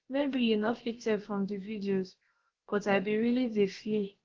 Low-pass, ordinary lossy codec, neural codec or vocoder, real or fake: 7.2 kHz; Opus, 16 kbps; codec, 16 kHz, about 1 kbps, DyCAST, with the encoder's durations; fake